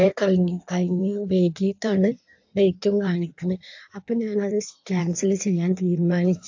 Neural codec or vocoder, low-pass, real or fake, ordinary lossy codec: codec, 16 kHz in and 24 kHz out, 1.1 kbps, FireRedTTS-2 codec; 7.2 kHz; fake; AAC, 48 kbps